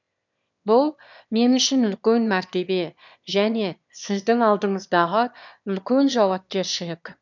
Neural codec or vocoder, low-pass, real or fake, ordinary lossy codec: autoencoder, 22.05 kHz, a latent of 192 numbers a frame, VITS, trained on one speaker; 7.2 kHz; fake; none